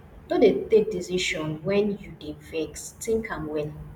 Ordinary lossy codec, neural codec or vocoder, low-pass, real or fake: none; none; 19.8 kHz; real